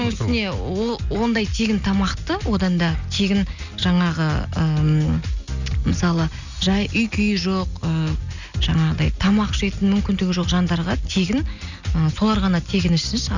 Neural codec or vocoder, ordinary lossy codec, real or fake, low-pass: none; none; real; 7.2 kHz